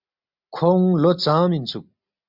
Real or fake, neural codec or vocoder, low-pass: real; none; 5.4 kHz